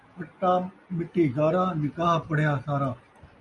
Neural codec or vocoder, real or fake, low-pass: none; real; 10.8 kHz